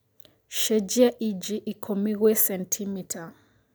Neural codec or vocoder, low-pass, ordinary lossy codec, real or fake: none; none; none; real